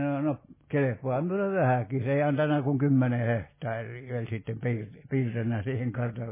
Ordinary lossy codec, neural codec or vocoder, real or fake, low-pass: MP3, 16 kbps; none; real; 3.6 kHz